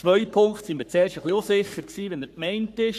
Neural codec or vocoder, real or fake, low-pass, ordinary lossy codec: codec, 44.1 kHz, 3.4 kbps, Pupu-Codec; fake; 14.4 kHz; none